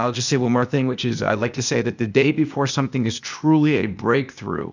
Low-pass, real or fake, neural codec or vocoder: 7.2 kHz; fake; codec, 16 kHz, 0.8 kbps, ZipCodec